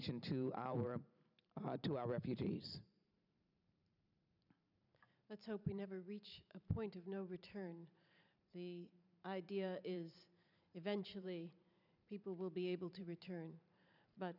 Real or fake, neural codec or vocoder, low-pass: fake; vocoder, 44.1 kHz, 128 mel bands every 512 samples, BigVGAN v2; 5.4 kHz